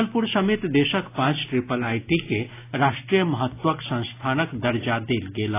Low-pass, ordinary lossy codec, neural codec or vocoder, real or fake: 3.6 kHz; AAC, 24 kbps; none; real